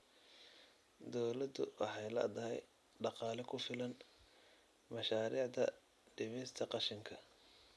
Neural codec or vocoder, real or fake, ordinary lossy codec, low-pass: none; real; none; none